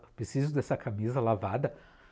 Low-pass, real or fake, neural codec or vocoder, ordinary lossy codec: none; real; none; none